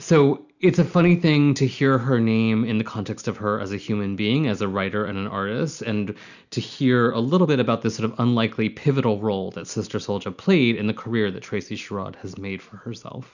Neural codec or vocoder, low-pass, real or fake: none; 7.2 kHz; real